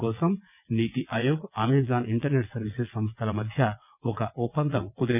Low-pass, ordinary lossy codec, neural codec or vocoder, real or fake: 3.6 kHz; none; vocoder, 22.05 kHz, 80 mel bands, WaveNeXt; fake